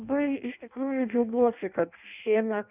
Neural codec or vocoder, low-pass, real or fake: codec, 16 kHz in and 24 kHz out, 0.6 kbps, FireRedTTS-2 codec; 3.6 kHz; fake